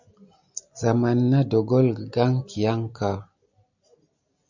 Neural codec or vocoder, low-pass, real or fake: none; 7.2 kHz; real